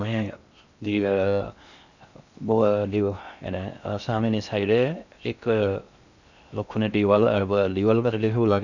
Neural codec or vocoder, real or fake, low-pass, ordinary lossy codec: codec, 16 kHz in and 24 kHz out, 0.6 kbps, FocalCodec, streaming, 2048 codes; fake; 7.2 kHz; none